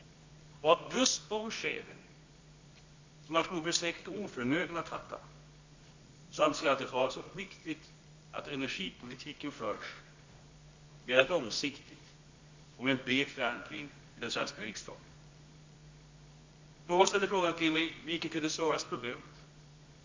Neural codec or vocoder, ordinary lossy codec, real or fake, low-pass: codec, 24 kHz, 0.9 kbps, WavTokenizer, medium music audio release; MP3, 48 kbps; fake; 7.2 kHz